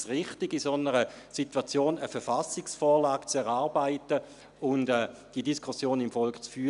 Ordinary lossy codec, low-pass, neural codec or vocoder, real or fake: none; 10.8 kHz; none; real